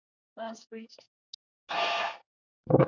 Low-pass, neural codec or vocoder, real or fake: 7.2 kHz; codec, 24 kHz, 1 kbps, SNAC; fake